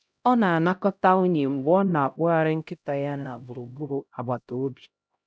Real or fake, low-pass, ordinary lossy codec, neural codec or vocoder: fake; none; none; codec, 16 kHz, 0.5 kbps, X-Codec, HuBERT features, trained on LibriSpeech